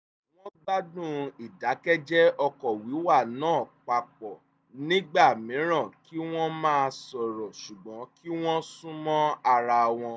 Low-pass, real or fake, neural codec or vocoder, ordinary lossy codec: none; real; none; none